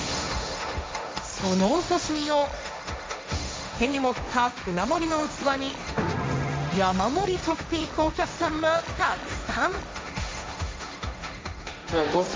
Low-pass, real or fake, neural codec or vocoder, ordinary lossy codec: none; fake; codec, 16 kHz, 1.1 kbps, Voila-Tokenizer; none